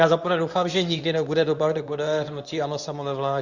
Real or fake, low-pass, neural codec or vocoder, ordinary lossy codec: fake; 7.2 kHz; codec, 24 kHz, 0.9 kbps, WavTokenizer, medium speech release version 2; Opus, 64 kbps